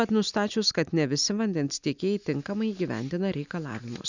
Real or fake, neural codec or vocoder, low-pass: real; none; 7.2 kHz